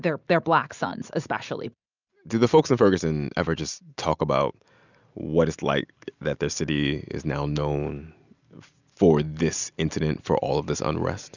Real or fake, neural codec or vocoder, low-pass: real; none; 7.2 kHz